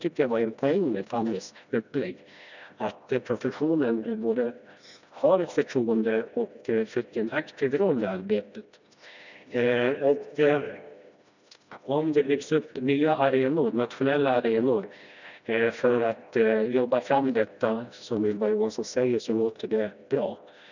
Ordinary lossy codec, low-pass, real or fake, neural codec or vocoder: none; 7.2 kHz; fake; codec, 16 kHz, 1 kbps, FreqCodec, smaller model